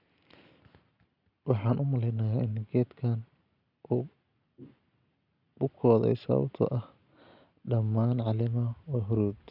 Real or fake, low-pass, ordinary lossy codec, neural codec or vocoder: real; 5.4 kHz; none; none